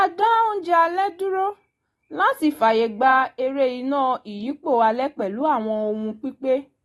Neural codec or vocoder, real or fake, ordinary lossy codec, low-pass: none; real; AAC, 32 kbps; 19.8 kHz